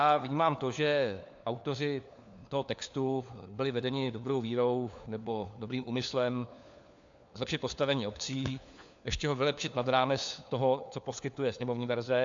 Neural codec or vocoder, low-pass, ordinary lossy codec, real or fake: codec, 16 kHz, 4 kbps, FunCodec, trained on LibriTTS, 50 frames a second; 7.2 kHz; AAC, 64 kbps; fake